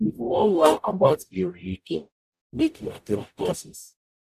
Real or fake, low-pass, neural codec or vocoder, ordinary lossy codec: fake; 14.4 kHz; codec, 44.1 kHz, 0.9 kbps, DAC; none